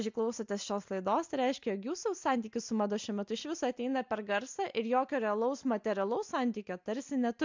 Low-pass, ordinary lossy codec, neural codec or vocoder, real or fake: 7.2 kHz; MP3, 64 kbps; none; real